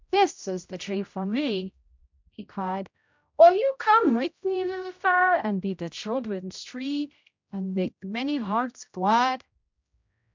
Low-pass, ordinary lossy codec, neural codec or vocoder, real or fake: 7.2 kHz; MP3, 64 kbps; codec, 16 kHz, 0.5 kbps, X-Codec, HuBERT features, trained on general audio; fake